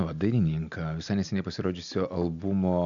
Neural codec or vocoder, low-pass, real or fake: none; 7.2 kHz; real